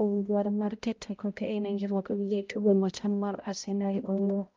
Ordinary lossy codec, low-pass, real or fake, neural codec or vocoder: Opus, 32 kbps; 7.2 kHz; fake; codec, 16 kHz, 0.5 kbps, X-Codec, HuBERT features, trained on balanced general audio